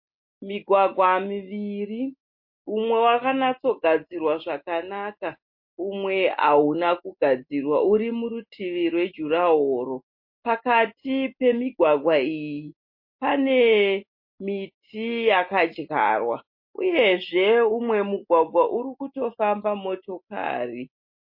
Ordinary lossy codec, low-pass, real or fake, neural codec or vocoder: MP3, 32 kbps; 5.4 kHz; real; none